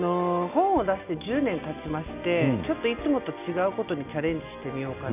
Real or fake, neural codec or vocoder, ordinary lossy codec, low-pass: real; none; AAC, 32 kbps; 3.6 kHz